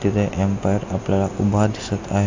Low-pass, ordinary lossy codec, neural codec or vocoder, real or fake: 7.2 kHz; AAC, 48 kbps; none; real